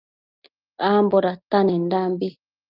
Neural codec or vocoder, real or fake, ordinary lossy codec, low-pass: none; real; Opus, 32 kbps; 5.4 kHz